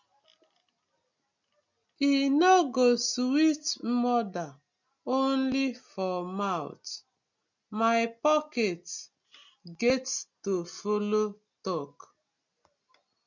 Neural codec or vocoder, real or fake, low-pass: none; real; 7.2 kHz